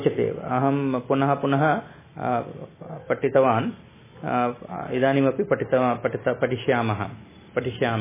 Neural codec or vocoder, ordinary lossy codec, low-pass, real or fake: none; MP3, 16 kbps; 3.6 kHz; real